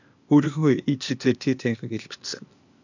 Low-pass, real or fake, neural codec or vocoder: 7.2 kHz; fake; codec, 16 kHz, 0.8 kbps, ZipCodec